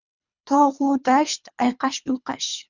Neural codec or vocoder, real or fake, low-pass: codec, 24 kHz, 3 kbps, HILCodec; fake; 7.2 kHz